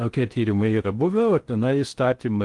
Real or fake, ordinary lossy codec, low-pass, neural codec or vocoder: fake; Opus, 24 kbps; 10.8 kHz; codec, 16 kHz in and 24 kHz out, 0.6 kbps, FocalCodec, streaming, 4096 codes